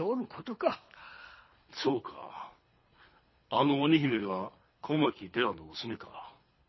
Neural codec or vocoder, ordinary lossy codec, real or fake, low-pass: codec, 24 kHz, 3 kbps, HILCodec; MP3, 24 kbps; fake; 7.2 kHz